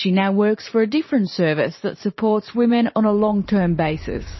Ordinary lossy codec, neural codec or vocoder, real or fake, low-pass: MP3, 24 kbps; vocoder, 44.1 kHz, 128 mel bands every 512 samples, BigVGAN v2; fake; 7.2 kHz